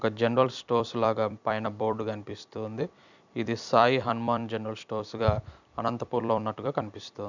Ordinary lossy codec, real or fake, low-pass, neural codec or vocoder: none; fake; 7.2 kHz; vocoder, 44.1 kHz, 128 mel bands every 256 samples, BigVGAN v2